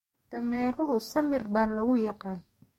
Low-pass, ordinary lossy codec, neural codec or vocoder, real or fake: 19.8 kHz; MP3, 64 kbps; codec, 44.1 kHz, 2.6 kbps, DAC; fake